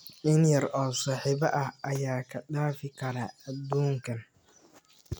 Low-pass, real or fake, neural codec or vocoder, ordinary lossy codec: none; real; none; none